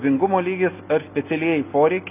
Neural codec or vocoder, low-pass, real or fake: none; 3.6 kHz; real